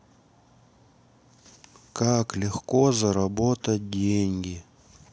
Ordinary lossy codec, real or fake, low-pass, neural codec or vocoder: none; real; none; none